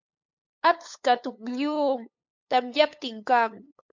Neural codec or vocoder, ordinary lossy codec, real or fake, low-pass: codec, 16 kHz, 8 kbps, FunCodec, trained on LibriTTS, 25 frames a second; MP3, 64 kbps; fake; 7.2 kHz